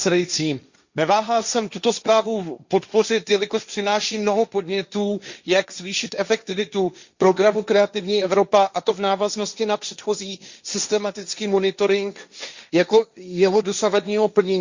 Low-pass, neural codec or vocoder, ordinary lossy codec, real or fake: 7.2 kHz; codec, 16 kHz, 1.1 kbps, Voila-Tokenizer; Opus, 64 kbps; fake